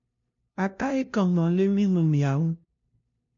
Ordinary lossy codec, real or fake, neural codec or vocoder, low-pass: MP3, 48 kbps; fake; codec, 16 kHz, 0.5 kbps, FunCodec, trained on LibriTTS, 25 frames a second; 7.2 kHz